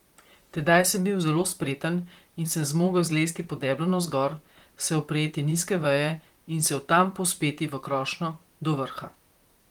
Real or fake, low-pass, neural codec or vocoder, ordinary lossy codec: fake; 19.8 kHz; vocoder, 44.1 kHz, 128 mel bands, Pupu-Vocoder; Opus, 24 kbps